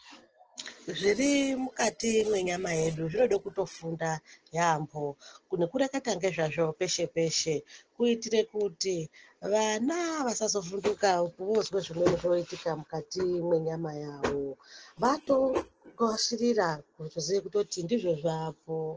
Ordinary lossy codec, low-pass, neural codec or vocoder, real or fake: Opus, 16 kbps; 7.2 kHz; none; real